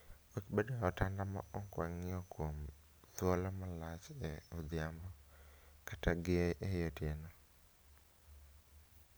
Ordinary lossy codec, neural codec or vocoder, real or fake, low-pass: none; none; real; none